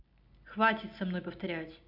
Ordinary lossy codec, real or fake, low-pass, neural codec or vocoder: none; real; 5.4 kHz; none